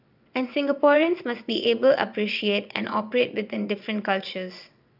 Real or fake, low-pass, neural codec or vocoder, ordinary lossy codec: fake; 5.4 kHz; vocoder, 22.05 kHz, 80 mel bands, WaveNeXt; none